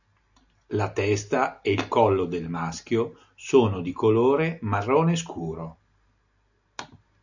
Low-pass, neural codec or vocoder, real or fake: 7.2 kHz; none; real